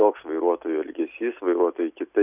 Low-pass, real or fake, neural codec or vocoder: 3.6 kHz; real; none